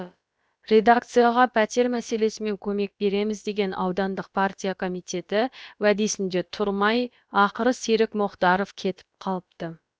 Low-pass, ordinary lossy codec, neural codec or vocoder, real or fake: none; none; codec, 16 kHz, about 1 kbps, DyCAST, with the encoder's durations; fake